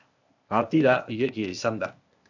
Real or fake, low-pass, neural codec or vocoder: fake; 7.2 kHz; codec, 16 kHz, 0.8 kbps, ZipCodec